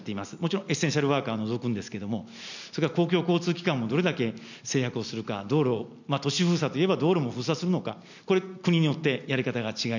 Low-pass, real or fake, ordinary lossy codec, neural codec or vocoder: 7.2 kHz; real; none; none